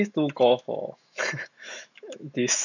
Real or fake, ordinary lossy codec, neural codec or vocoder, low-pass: fake; none; autoencoder, 48 kHz, 128 numbers a frame, DAC-VAE, trained on Japanese speech; 7.2 kHz